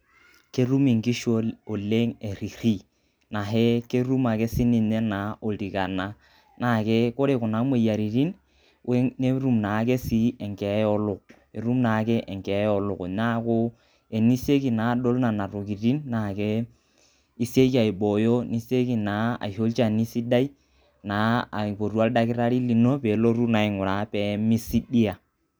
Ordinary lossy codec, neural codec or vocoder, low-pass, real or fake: none; none; none; real